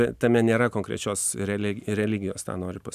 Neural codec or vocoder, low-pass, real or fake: none; 14.4 kHz; real